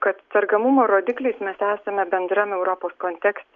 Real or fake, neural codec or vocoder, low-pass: real; none; 7.2 kHz